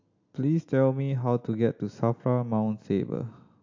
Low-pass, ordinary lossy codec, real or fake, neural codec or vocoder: 7.2 kHz; AAC, 48 kbps; real; none